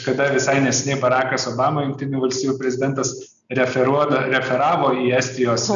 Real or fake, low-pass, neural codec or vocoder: real; 7.2 kHz; none